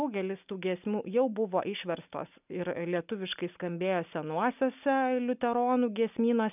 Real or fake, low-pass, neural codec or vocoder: real; 3.6 kHz; none